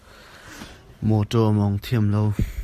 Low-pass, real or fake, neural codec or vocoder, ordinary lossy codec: 14.4 kHz; real; none; Opus, 64 kbps